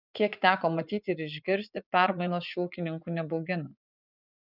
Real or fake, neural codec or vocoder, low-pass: fake; vocoder, 24 kHz, 100 mel bands, Vocos; 5.4 kHz